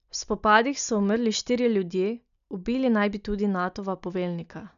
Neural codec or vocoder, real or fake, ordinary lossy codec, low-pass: none; real; none; 7.2 kHz